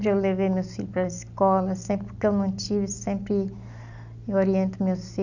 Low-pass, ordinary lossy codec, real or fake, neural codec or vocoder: 7.2 kHz; none; real; none